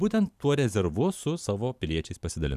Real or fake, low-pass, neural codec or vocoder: fake; 14.4 kHz; vocoder, 44.1 kHz, 128 mel bands every 512 samples, BigVGAN v2